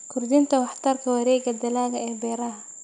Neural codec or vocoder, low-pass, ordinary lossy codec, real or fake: none; 9.9 kHz; none; real